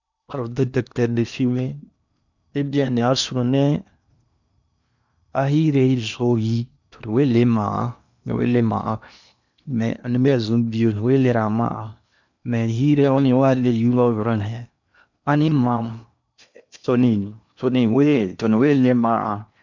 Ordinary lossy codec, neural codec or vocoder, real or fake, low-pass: none; codec, 16 kHz in and 24 kHz out, 0.8 kbps, FocalCodec, streaming, 65536 codes; fake; 7.2 kHz